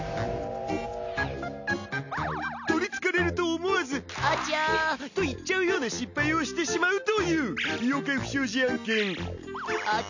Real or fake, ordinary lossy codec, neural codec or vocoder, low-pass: real; none; none; 7.2 kHz